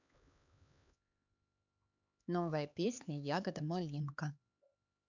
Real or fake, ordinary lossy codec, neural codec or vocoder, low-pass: fake; none; codec, 16 kHz, 4 kbps, X-Codec, HuBERT features, trained on LibriSpeech; 7.2 kHz